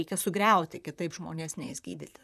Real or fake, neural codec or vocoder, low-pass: fake; vocoder, 44.1 kHz, 128 mel bands, Pupu-Vocoder; 14.4 kHz